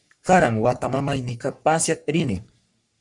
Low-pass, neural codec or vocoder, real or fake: 10.8 kHz; codec, 44.1 kHz, 3.4 kbps, Pupu-Codec; fake